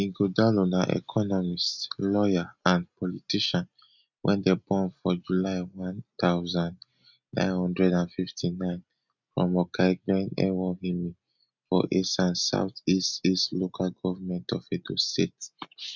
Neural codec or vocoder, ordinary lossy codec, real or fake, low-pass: none; none; real; 7.2 kHz